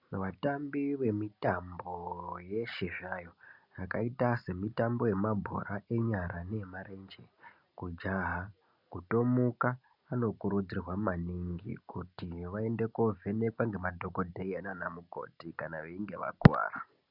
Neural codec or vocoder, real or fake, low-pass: none; real; 5.4 kHz